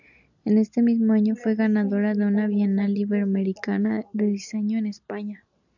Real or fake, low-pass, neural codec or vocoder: real; 7.2 kHz; none